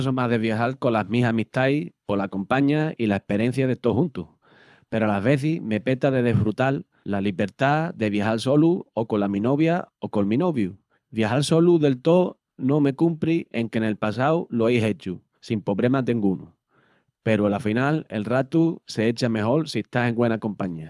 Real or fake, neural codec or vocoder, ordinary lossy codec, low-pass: fake; codec, 24 kHz, 6 kbps, HILCodec; none; none